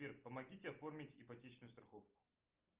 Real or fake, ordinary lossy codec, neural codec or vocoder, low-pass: real; Opus, 32 kbps; none; 3.6 kHz